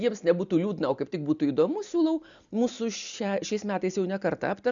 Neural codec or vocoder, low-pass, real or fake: none; 7.2 kHz; real